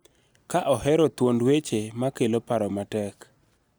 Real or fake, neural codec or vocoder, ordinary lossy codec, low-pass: real; none; none; none